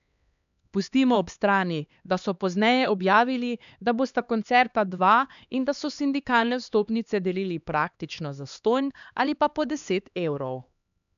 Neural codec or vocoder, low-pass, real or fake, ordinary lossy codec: codec, 16 kHz, 2 kbps, X-Codec, HuBERT features, trained on LibriSpeech; 7.2 kHz; fake; none